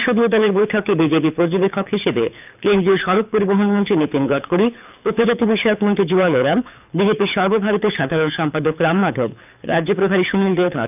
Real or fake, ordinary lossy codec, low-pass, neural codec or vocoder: fake; none; 3.6 kHz; codec, 24 kHz, 6 kbps, HILCodec